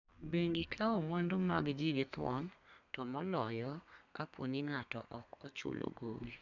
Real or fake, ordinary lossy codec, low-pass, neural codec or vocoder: fake; none; 7.2 kHz; codec, 32 kHz, 1.9 kbps, SNAC